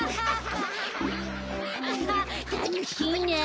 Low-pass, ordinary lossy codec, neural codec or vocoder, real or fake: none; none; none; real